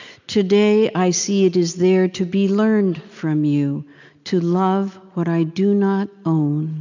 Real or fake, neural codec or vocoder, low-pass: real; none; 7.2 kHz